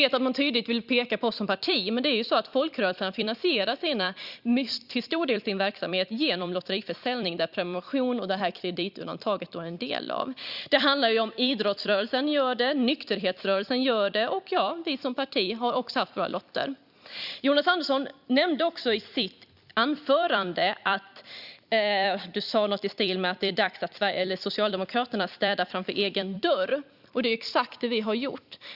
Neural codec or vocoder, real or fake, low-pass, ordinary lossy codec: vocoder, 44.1 kHz, 128 mel bands every 256 samples, BigVGAN v2; fake; 5.4 kHz; Opus, 64 kbps